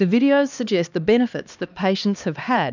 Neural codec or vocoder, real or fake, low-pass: codec, 16 kHz, 2 kbps, X-Codec, HuBERT features, trained on LibriSpeech; fake; 7.2 kHz